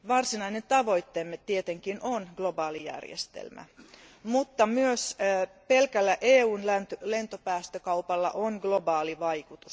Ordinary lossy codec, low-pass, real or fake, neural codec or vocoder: none; none; real; none